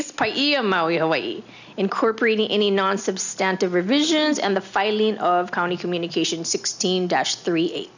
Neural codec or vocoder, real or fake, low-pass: none; real; 7.2 kHz